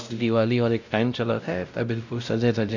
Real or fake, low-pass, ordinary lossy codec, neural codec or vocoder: fake; 7.2 kHz; none; codec, 16 kHz, 0.5 kbps, X-Codec, WavLM features, trained on Multilingual LibriSpeech